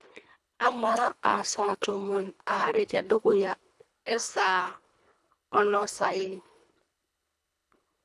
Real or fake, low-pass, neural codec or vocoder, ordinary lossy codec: fake; none; codec, 24 kHz, 1.5 kbps, HILCodec; none